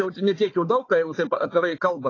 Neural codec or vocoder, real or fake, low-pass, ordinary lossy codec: codec, 16 kHz, 4 kbps, FunCodec, trained on Chinese and English, 50 frames a second; fake; 7.2 kHz; AAC, 32 kbps